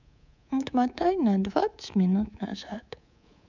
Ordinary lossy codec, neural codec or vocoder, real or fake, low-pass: none; codec, 24 kHz, 3.1 kbps, DualCodec; fake; 7.2 kHz